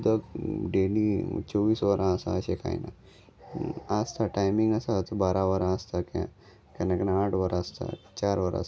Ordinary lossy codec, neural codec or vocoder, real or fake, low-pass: none; none; real; none